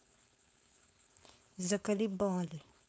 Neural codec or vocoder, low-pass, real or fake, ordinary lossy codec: codec, 16 kHz, 4.8 kbps, FACodec; none; fake; none